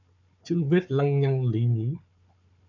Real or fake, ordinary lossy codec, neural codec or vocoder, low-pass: fake; MP3, 64 kbps; codec, 16 kHz, 4 kbps, FunCodec, trained on Chinese and English, 50 frames a second; 7.2 kHz